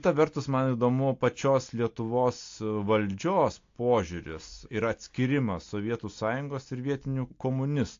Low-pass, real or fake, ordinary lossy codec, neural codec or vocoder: 7.2 kHz; real; AAC, 48 kbps; none